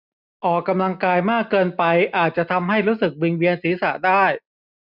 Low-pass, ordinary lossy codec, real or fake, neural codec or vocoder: 5.4 kHz; none; real; none